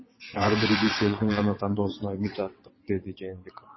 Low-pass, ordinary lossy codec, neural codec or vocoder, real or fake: 7.2 kHz; MP3, 24 kbps; vocoder, 22.05 kHz, 80 mel bands, WaveNeXt; fake